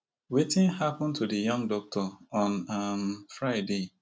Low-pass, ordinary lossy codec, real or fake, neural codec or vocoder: none; none; real; none